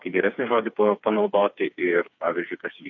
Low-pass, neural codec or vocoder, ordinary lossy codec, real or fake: 7.2 kHz; codec, 16 kHz, 4 kbps, FreqCodec, smaller model; MP3, 48 kbps; fake